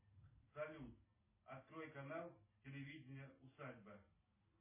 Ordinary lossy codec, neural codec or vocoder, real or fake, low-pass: MP3, 16 kbps; none; real; 3.6 kHz